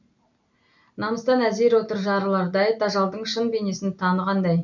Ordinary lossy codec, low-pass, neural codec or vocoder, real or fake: MP3, 64 kbps; 7.2 kHz; none; real